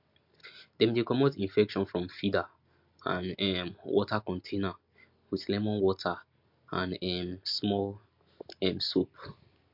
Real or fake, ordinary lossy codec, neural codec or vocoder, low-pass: real; MP3, 48 kbps; none; 5.4 kHz